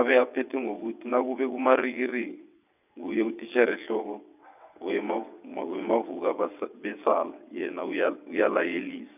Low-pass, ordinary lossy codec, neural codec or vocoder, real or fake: 3.6 kHz; none; vocoder, 22.05 kHz, 80 mel bands, WaveNeXt; fake